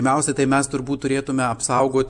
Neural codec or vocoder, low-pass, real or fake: none; 10.8 kHz; real